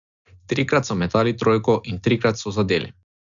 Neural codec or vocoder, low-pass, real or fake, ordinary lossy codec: none; 7.2 kHz; real; none